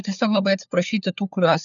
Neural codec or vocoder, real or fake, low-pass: codec, 16 kHz, 4 kbps, FunCodec, trained on LibriTTS, 50 frames a second; fake; 7.2 kHz